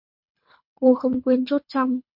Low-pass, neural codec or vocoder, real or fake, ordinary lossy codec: 5.4 kHz; codec, 24 kHz, 3 kbps, HILCodec; fake; MP3, 48 kbps